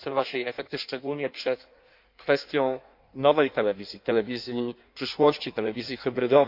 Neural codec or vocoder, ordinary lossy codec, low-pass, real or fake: codec, 16 kHz in and 24 kHz out, 1.1 kbps, FireRedTTS-2 codec; MP3, 48 kbps; 5.4 kHz; fake